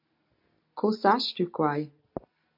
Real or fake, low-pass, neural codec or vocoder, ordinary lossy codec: real; 5.4 kHz; none; AAC, 48 kbps